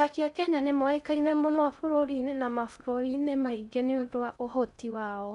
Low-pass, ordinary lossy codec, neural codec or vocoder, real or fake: 10.8 kHz; none; codec, 16 kHz in and 24 kHz out, 0.6 kbps, FocalCodec, streaming, 4096 codes; fake